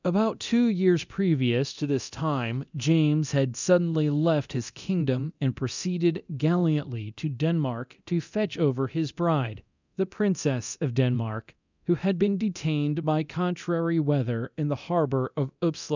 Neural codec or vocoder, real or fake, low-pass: codec, 24 kHz, 0.9 kbps, DualCodec; fake; 7.2 kHz